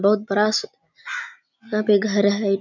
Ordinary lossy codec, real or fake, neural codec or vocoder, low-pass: none; real; none; 7.2 kHz